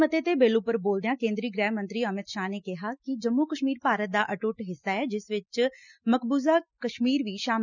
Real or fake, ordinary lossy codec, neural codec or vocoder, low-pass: real; none; none; 7.2 kHz